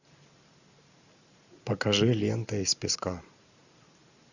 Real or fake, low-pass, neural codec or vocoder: fake; 7.2 kHz; vocoder, 44.1 kHz, 128 mel bands every 512 samples, BigVGAN v2